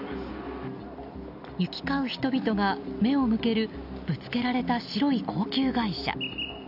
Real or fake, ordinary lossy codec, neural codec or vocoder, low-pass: real; none; none; 5.4 kHz